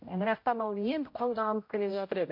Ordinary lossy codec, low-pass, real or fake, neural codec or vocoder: MP3, 32 kbps; 5.4 kHz; fake; codec, 16 kHz, 0.5 kbps, X-Codec, HuBERT features, trained on general audio